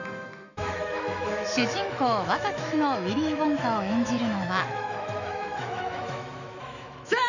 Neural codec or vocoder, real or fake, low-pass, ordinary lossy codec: autoencoder, 48 kHz, 128 numbers a frame, DAC-VAE, trained on Japanese speech; fake; 7.2 kHz; none